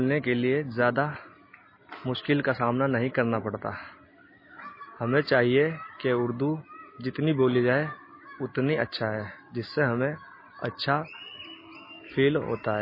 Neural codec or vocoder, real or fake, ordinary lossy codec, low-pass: none; real; MP3, 24 kbps; 5.4 kHz